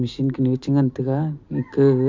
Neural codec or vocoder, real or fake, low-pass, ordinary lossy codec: none; real; 7.2 kHz; MP3, 48 kbps